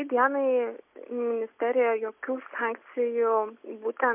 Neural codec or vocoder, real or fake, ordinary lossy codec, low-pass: none; real; MP3, 32 kbps; 3.6 kHz